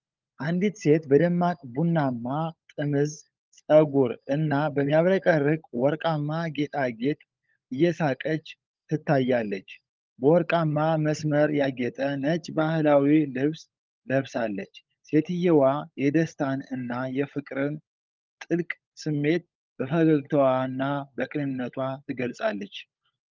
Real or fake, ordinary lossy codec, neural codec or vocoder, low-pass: fake; Opus, 24 kbps; codec, 16 kHz, 16 kbps, FunCodec, trained on LibriTTS, 50 frames a second; 7.2 kHz